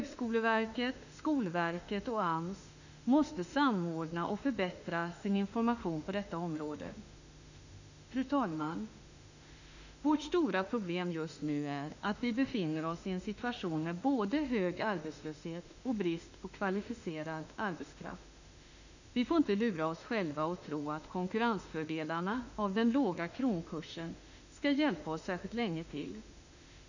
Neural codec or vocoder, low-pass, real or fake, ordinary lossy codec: autoencoder, 48 kHz, 32 numbers a frame, DAC-VAE, trained on Japanese speech; 7.2 kHz; fake; none